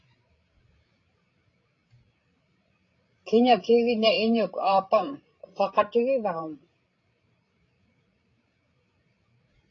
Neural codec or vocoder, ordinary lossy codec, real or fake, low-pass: codec, 16 kHz, 16 kbps, FreqCodec, larger model; AAC, 32 kbps; fake; 7.2 kHz